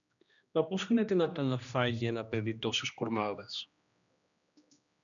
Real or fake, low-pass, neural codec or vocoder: fake; 7.2 kHz; codec, 16 kHz, 1 kbps, X-Codec, HuBERT features, trained on general audio